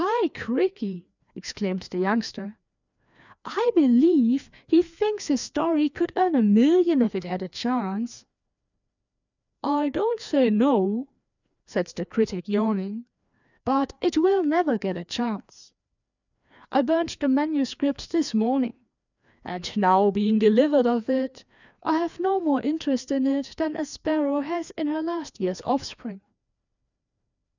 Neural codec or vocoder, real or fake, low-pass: codec, 16 kHz, 2 kbps, FreqCodec, larger model; fake; 7.2 kHz